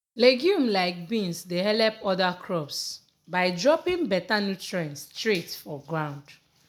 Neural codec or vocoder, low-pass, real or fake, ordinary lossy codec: none; none; real; none